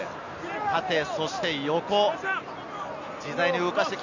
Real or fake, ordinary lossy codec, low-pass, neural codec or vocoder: real; none; 7.2 kHz; none